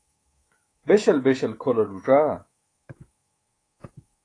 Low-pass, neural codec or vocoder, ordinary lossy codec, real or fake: 9.9 kHz; codec, 24 kHz, 3.1 kbps, DualCodec; AAC, 32 kbps; fake